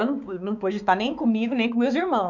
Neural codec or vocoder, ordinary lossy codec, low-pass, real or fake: codec, 16 kHz, 4 kbps, X-Codec, HuBERT features, trained on balanced general audio; none; 7.2 kHz; fake